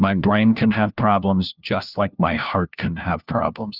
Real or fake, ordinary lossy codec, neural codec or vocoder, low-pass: fake; Opus, 24 kbps; codec, 16 kHz, 1 kbps, FunCodec, trained on LibriTTS, 50 frames a second; 5.4 kHz